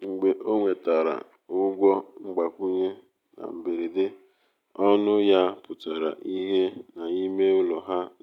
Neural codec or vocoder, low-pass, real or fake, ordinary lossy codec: none; 19.8 kHz; real; none